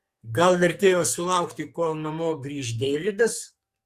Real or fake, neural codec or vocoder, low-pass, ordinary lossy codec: fake; codec, 44.1 kHz, 2.6 kbps, SNAC; 14.4 kHz; Opus, 64 kbps